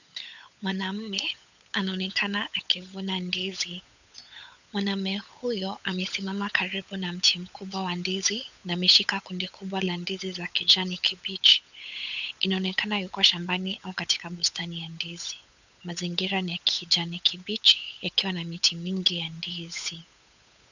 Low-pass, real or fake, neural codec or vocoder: 7.2 kHz; fake; codec, 16 kHz, 8 kbps, FunCodec, trained on Chinese and English, 25 frames a second